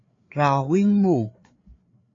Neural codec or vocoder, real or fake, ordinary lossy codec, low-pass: codec, 16 kHz, 4 kbps, FreqCodec, larger model; fake; AAC, 32 kbps; 7.2 kHz